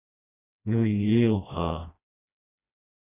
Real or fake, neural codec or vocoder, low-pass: fake; codec, 16 kHz, 1 kbps, FreqCodec, smaller model; 3.6 kHz